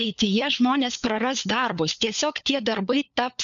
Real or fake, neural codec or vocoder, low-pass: fake; codec, 16 kHz, 4 kbps, FunCodec, trained on LibriTTS, 50 frames a second; 7.2 kHz